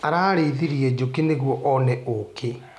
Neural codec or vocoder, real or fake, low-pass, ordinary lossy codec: none; real; none; none